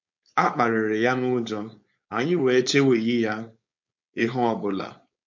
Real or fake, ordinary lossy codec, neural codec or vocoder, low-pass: fake; MP3, 48 kbps; codec, 16 kHz, 4.8 kbps, FACodec; 7.2 kHz